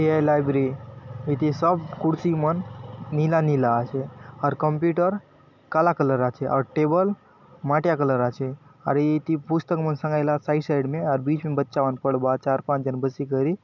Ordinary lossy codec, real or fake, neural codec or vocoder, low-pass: none; real; none; 7.2 kHz